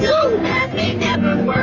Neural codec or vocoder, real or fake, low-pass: codec, 44.1 kHz, 3.4 kbps, Pupu-Codec; fake; 7.2 kHz